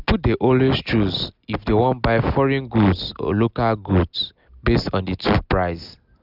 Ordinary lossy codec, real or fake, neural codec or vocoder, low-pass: none; real; none; 5.4 kHz